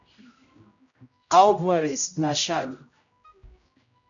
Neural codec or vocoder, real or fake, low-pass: codec, 16 kHz, 0.5 kbps, X-Codec, HuBERT features, trained on general audio; fake; 7.2 kHz